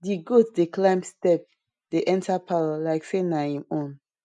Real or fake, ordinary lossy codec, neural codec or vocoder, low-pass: real; none; none; 10.8 kHz